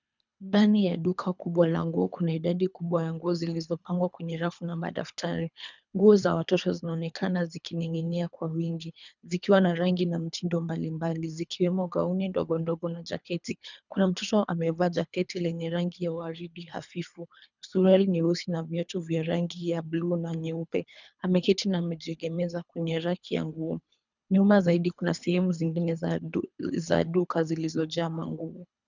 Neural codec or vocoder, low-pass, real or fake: codec, 24 kHz, 3 kbps, HILCodec; 7.2 kHz; fake